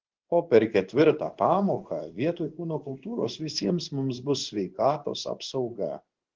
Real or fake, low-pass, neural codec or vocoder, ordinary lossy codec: fake; 7.2 kHz; codec, 16 kHz in and 24 kHz out, 1 kbps, XY-Tokenizer; Opus, 16 kbps